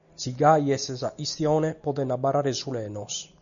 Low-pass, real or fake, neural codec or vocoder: 7.2 kHz; real; none